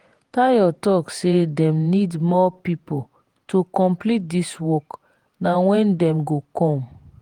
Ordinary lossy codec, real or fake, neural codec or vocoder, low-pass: Opus, 24 kbps; fake; vocoder, 48 kHz, 128 mel bands, Vocos; 19.8 kHz